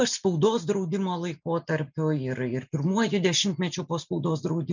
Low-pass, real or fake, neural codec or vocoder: 7.2 kHz; real; none